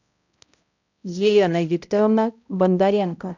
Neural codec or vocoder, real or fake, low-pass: codec, 16 kHz, 0.5 kbps, X-Codec, HuBERT features, trained on balanced general audio; fake; 7.2 kHz